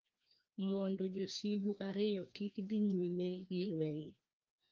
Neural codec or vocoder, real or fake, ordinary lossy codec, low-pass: codec, 16 kHz, 1 kbps, FreqCodec, larger model; fake; Opus, 32 kbps; 7.2 kHz